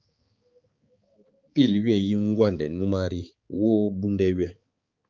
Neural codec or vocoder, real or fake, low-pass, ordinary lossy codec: codec, 16 kHz, 4 kbps, X-Codec, HuBERT features, trained on balanced general audio; fake; 7.2 kHz; Opus, 24 kbps